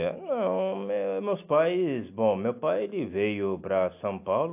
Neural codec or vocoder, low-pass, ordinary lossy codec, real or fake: none; 3.6 kHz; AAC, 32 kbps; real